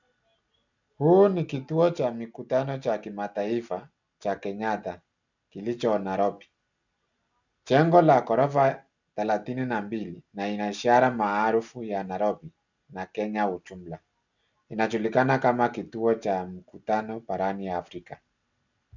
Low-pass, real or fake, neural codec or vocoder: 7.2 kHz; real; none